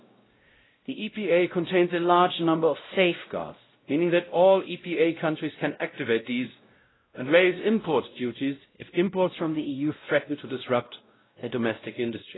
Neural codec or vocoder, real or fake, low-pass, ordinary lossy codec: codec, 16 kHz, 0.5 kbps, X-Codec, WavLM features, trained on Multilingual LibriSpeech; fake; 7.2 kHz; AAC, 16 kbps